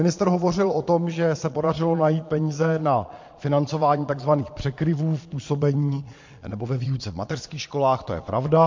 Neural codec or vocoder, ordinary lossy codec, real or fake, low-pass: vocoder, 22.05 kHz, 80 mel bands, Vocos; MP3, 48 kbps; fake; 7.2 kHz